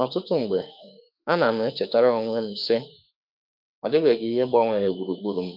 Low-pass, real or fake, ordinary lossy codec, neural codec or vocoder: 5.4 kHz; fake; none; autoencoder, 48 kHz, 32 numbers a frame, DAC-VAE, trained on Japanese speech